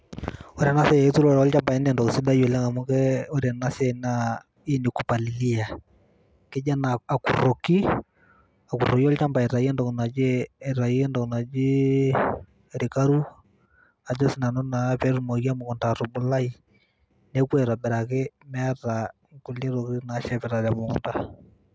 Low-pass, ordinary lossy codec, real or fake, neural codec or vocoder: none; none; real; none